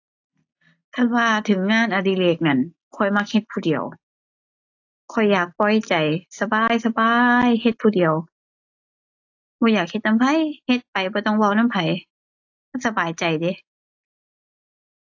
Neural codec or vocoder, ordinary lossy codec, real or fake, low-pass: none; AAC, 48 kbps; real; 7.2 kHz